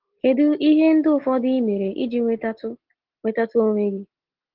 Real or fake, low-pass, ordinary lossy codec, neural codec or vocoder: real; 5.4 kHz; Opus, 16 kbps; none